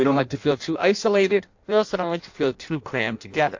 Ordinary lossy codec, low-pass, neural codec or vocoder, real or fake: AAC, 48 kbps; 7.2 kHz; codec, 16 kHz in and 24 kHz out, 0.6 kbps, FireRedTTS-2 codec; fake